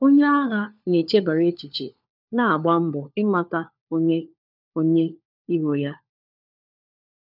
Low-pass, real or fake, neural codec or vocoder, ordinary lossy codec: 5.4 kHz; fake; codec, 16 kHz, 4 kbps, FunCodec, trained on LibriTTS, 50 frames a second; none